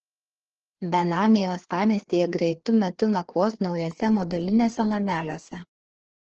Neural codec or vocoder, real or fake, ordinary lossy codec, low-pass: codec, 16 kHz, 2 kbps, FreqCodec, larger model; fake; Opus, 16 kbps; 7.2 kHz